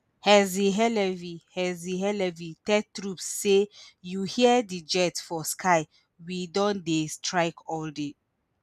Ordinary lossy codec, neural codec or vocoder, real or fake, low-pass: none; none; real; 14.4 kHz